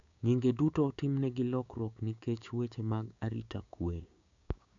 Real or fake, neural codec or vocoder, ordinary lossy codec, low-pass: fake; codec, 16 kHz, 6 kbps, DAC; AAC, 64 kbps; 7.2 kHz